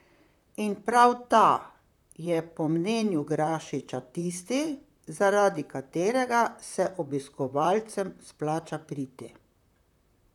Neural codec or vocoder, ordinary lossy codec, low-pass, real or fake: vocoder, 44.1 kHz, 128 mel bands, Pupu-Vocoder; none; 19.8 kHz; fake